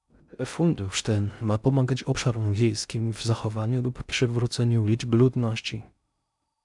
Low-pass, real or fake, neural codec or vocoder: 10.8 kHz; fake; codec, 16 kHz in and 24 kHz out, 0.6 kbps, FocalCodec, streaming, 2048 codes